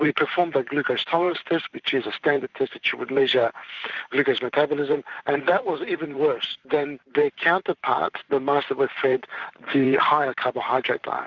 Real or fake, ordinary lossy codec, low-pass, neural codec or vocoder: fake; Opus, 64 kbps; 7.2 kHz; vocoder, 44.1 kHz, 128 mel bands every 512 samples, BigVGAN v2